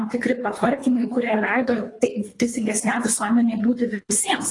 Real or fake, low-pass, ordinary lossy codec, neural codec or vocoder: fake; 10.8 kHz; AAC, 32 kbps; codec, 24 kHz, 3 kbps, HILCodec